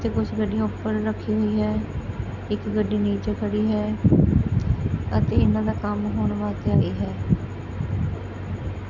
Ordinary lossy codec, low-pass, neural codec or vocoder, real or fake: none; 7.2 kHz; none; real